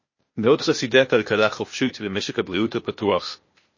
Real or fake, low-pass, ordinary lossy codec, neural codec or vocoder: fake; 7.2 kHz; MP3, 32 kbps; codec, 16 kHz, 0.8 kbps, ZipCodec